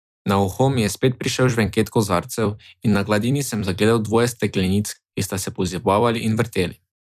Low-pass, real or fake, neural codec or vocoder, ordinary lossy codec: 14.4 kHz; fake; vocoder, 44.1 kHz, 128 mel bands every 256 samples, BigVGAN v2; none